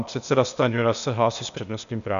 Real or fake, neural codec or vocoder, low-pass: fake; codec, 16 kHz, 0.8 kbps, ZipCodec; 7.2 kHz